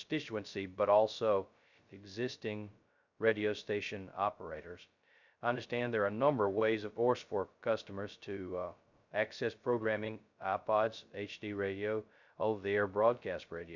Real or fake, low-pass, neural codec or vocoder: fake; 7.2 kHz; codec, 16 kHz, 0.2 kbps, FocalCodec